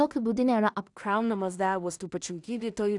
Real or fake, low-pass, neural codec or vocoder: fake; 10.8 kHz; codec, 16 kHz in and 24 kHz out, 0.4 kbps, LongCat-Audio-Codec, two codebook decoder